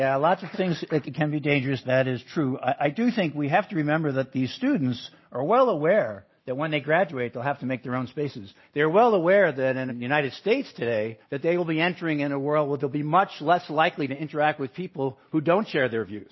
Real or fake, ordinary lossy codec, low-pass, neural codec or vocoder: real; MP3, 24 kbps; 7.2 kHz; none